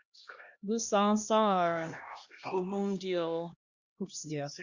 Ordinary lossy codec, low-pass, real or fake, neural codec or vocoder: Opus, 64 kbps; 7.2 kHz; fake; codec, 16 kHz, 1 kbps, X-Codec, HuBERT features, trained on LibriSpeech